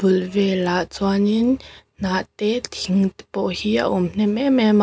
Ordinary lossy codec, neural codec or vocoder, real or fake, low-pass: none; none; real; none